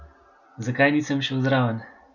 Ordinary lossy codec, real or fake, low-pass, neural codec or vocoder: none; real; 7.2 kHz; none